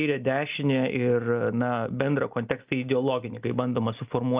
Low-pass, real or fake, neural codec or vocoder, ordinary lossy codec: 3.6 kHz; real; none; Opus, 24 kbps